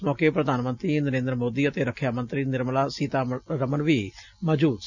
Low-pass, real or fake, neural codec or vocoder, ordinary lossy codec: 7.2 kHz; real; none; none